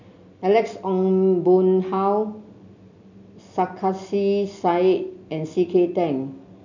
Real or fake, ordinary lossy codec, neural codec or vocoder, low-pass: real; none; none; 7.2 kHz